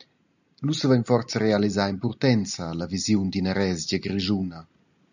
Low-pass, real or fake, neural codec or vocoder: 7.2 kHz; real; none